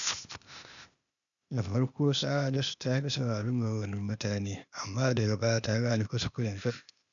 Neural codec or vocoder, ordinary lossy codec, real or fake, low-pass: codec, 16 kHz, 0.8 kbps, ZipCodec; none; fake; 7.2 kHz